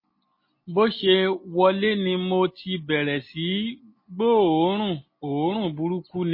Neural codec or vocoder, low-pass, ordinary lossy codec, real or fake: none; 5.4 kHz; MP3, 24 kbps; real